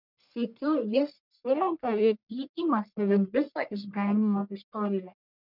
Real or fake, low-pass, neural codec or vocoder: fake; 5.4 kHz; codec, 44.1 kHz, 1.7 kbps, Pupu-Codec